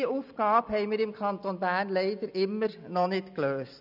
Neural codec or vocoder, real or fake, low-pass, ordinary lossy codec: none; real; 5.4 kHz; none